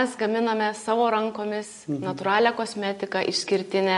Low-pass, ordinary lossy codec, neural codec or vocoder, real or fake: 14.4 kHz; MP3, 48 kbps; none; real